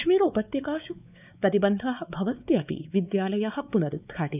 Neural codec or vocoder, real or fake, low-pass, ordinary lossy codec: codec, 16 kHz, 4 kbps, X-Codec, WavLM features, trained on Multilingual LibriSpeech; fake; 3.6 kHz; none